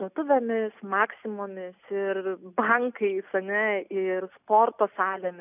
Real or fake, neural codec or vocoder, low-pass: real; none; 3.6 kHz